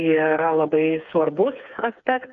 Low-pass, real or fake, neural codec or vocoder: 7.2 kHz; fake; codec, 16 kHz, 4 kbps, FreqCodec, smaller model